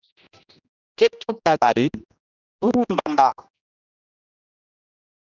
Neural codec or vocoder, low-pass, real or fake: codec, 16 kHz, 1 kbps, X-Codec, HuBERT features, trained on general audio; 7.2 kHz; fake